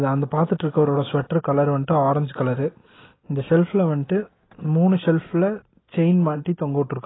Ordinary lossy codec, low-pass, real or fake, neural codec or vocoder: AAC, 16 kbps; 7.2 kHz; real; none